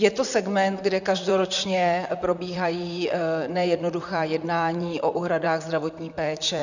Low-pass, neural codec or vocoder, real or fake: 7.2 kHz; vocoder, 44.1 kHz, 128 mel bands, Pupu-Vocoder; fake